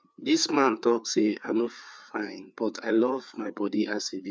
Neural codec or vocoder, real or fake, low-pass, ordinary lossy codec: codec, 16 kHz, 4 kbps, FreqCodec, larger model; fake; none; none